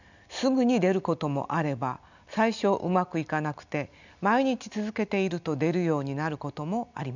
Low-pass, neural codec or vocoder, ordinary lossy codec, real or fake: 7.2 kHz; none; none; real